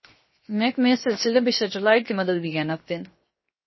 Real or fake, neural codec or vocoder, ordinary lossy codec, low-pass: fake; codec, 16 kHz, 0.7 kbps, FocalCodec; MP3, 24 kbps; 7.2 kHz